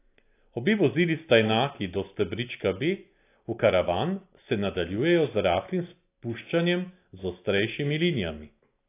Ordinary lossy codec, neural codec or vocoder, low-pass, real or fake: AAC, 24 kbps; none; 3.6 kHz; real